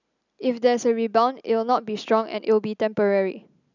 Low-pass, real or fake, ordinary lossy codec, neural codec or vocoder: 7.2 kHz; real; none; none